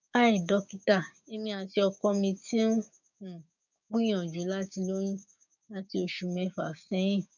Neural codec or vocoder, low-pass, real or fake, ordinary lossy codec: codec, 16 kHz, 6 kbps, DAC; 7.2 kHz; fake; none